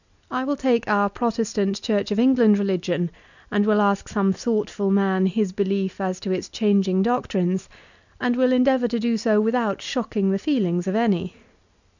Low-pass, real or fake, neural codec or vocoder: 7.2 kHz; real; none